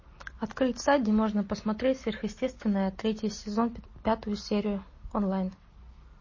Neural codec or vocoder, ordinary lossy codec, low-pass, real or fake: none; MP3, 32 kbps; 7.2 kHz; real